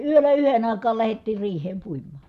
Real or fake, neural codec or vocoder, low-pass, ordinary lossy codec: real; none; 14.4 kHz; none